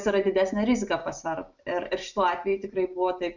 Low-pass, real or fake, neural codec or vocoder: 7.2 kHz; real; none